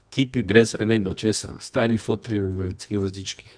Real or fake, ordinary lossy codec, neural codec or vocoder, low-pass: fake; none; codec, 24 kHz, 0.9 kbps, WavTokenizer, medium music audio release; 9.9 kHz